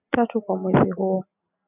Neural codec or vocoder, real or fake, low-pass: none; real; 3.6 kHz